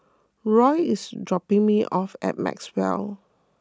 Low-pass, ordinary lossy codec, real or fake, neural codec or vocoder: none; none; real; none